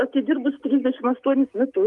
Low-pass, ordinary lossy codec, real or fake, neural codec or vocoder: 10.8 kHz; Opus, 32 kbps; real; none